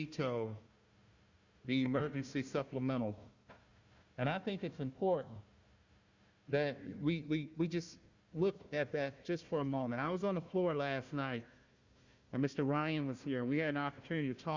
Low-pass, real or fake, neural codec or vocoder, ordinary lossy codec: 7.2 kHz; fake; codec, 16 kHz, 1 kbps, FunCodec, trained on Chinese and English, 50 frames a second; Opus, 64 kbps